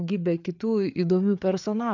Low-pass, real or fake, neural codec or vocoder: 7.2 kHz; fake; codec, 16 kHz, 4 kbps, FreqCodec, larger model